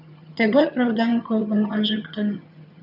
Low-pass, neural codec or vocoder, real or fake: 5.4 kHz; vocoder, 22.05 kHz, 80 mel bands, HiFi-GAN; fake